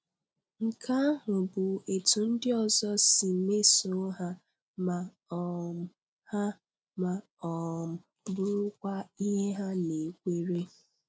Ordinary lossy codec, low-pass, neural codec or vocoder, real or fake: none; none; none; real